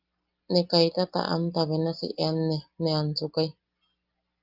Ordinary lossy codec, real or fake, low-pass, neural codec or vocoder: Opus, 32 kbps; real; 5.4 kHz; none